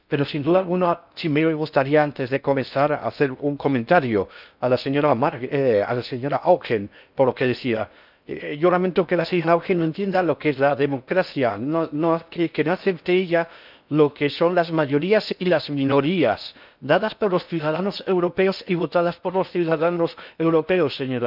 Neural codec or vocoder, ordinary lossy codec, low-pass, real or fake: codec, 16 kHz in and 24 kHz out, 0.6 kbps, FocalCodec, streaming, 4096 codes; none; 5.4 kHz; fake